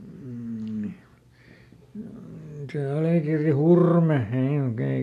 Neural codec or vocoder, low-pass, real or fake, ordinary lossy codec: none; 14.4 kHz; real; AAC, 64 kbps